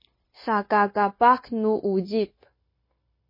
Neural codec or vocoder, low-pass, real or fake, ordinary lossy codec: codec, 16 kHz, 0.9 kbps, LongCat-Audio-Codec; 5.4 kHz; fake; MP3, 24 kbps